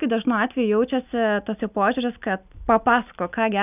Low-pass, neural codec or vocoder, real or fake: 3.6 kHz; none; real